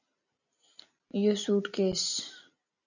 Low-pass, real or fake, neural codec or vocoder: 7.2 kHz; real; none